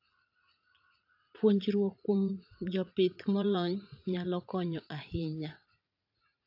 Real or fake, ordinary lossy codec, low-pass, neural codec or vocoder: fake; AAC, 48 kbps; 5.4 kHz; vocoder, 44.1 kHz, 80 mel bands, Vocos